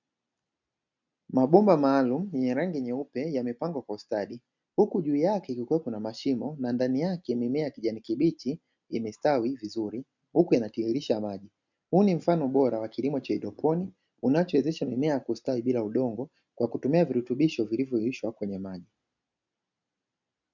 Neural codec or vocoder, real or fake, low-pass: none; real; 7.2 kHz